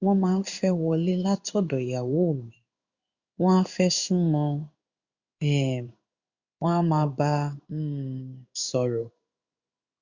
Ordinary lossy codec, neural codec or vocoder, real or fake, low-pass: Opus, 64 kbps; codec, 16 kHz in and 24 kHz out, 1 kbps, XY-Tokenizer; fake; 7.2 kHz